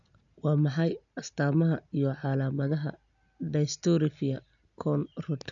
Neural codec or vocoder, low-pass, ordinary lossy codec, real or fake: none; 7.2 kHz; none; real